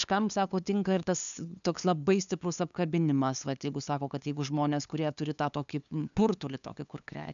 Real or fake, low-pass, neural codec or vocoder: fake; 7.2 kHz; codec, 16 kHz, 2 kbps, FunCodec, trained on LibriTTS, 25 frames a second